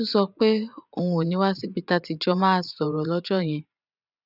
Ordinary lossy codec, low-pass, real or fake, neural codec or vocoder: none; 5.4 kHz; fake; vocoder, 24 kHz, 100 mel bands, Vocos